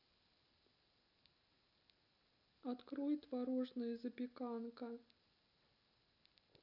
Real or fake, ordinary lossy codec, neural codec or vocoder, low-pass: real; none; none; 5.4 kHz